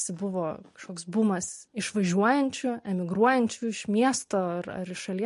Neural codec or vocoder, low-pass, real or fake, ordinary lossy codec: none; 14.4 kHz; real; MP3, 48 kbps